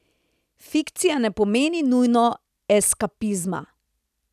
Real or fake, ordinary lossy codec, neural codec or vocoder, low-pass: fake; none; vocoder, 44.1 kHz, 128 mel bands every 256 samples, BigVGAN v2; 14.4 kHz